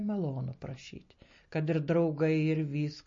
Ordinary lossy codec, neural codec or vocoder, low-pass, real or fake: MP3, 32 kbps; none; 7.2 kHz; real